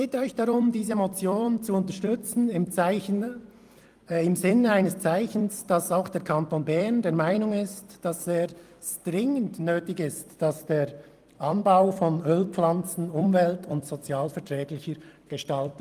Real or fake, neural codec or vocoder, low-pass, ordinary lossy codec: fake; vocoder, 44.1 kHz, 128 mel bands every 256 samples, BigVGAN v2; 14.4 kHz; Opus, 32 kbps